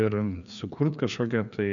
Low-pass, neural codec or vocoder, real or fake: 7.2 kHz; codec, 16 kHz, 2 kbps, FreqCodec, larger model; fake